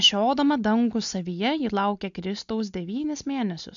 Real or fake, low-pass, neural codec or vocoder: real; 7.2 kHz; none